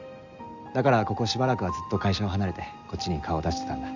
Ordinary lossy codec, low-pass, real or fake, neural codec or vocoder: Opus, 64 kbps; 7.2 kHz; real; none